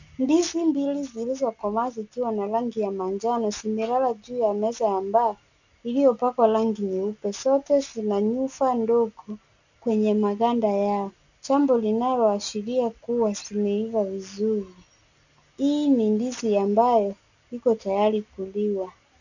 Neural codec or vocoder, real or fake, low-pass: none; real; 7.2 kHz